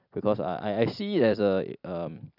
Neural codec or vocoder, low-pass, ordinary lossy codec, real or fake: vocoder, 44.1 kHz, 128 mel bands every 256 samples, BigVGAN v2; 5.4 kHz; none; fake